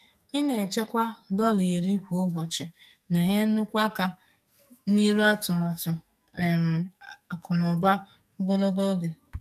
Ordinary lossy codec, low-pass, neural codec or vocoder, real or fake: none; 14.4 kHz; codec, 44.1 kHz, 2.6 kbps, SNAC; fake